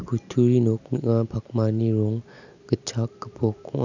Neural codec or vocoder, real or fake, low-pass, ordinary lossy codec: none; real; 7.2 kHz; none